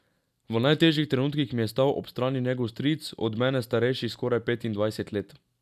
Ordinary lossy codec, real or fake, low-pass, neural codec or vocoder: none; real; 14.4 kHz; none